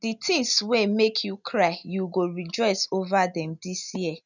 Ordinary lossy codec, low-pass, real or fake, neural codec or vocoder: none; 7.2 kHz; real; none